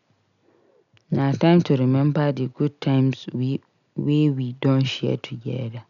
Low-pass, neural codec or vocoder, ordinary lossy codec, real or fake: 7.2 kHz; none; none; real